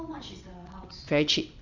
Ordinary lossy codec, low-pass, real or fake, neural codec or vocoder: MP3, 64 kbps; 7.2 kHz; fake; vocoder, 22.05 kHz, 80 mel bands, WaveNeXt